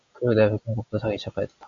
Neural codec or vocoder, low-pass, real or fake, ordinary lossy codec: none; 7.2 kHz; real; MP3, 64 kbps